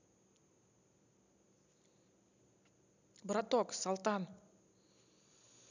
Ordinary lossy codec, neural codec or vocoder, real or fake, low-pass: none; none; real; 7.2 kHz